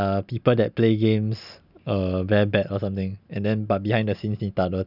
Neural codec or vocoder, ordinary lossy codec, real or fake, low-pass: none; none; real; 5.4 kHz